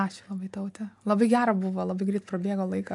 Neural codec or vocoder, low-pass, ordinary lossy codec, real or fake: none; 10.8 kHz; AAC, 64 kbps; real